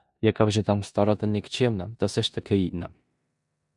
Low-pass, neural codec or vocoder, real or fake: 10.8 kHz; codec, 16 kHz in and 24 kHz out, 0.9 kbps, LongCat-Audio-Codec, four codebook decoder; fake